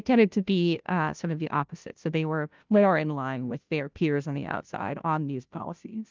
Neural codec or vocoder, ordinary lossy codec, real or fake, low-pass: codec, 16 kHz, 0.5 kbps, FunCodec, trained on Chinese and English, 25 frames a second; Opus, 24 kbps; fake; 7.2 kHz